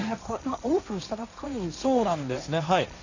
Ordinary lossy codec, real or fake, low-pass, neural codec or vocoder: none; fake; 7.2 kHz; codec, 16 kHz, 1.1 kbps, Voila-Tokenizer